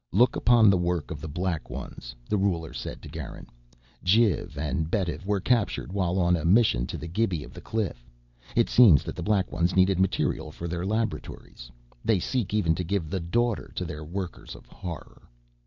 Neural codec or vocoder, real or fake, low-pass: none; real; 7.2 kHz